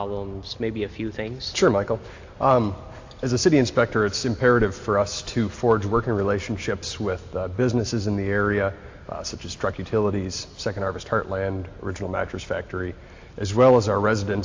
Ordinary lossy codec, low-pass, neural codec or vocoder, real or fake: AAC, 48 kbps; 7.2 kHz; none; real